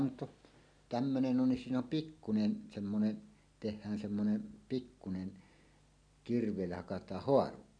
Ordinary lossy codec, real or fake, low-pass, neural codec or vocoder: none; real; 9.9 kHz; none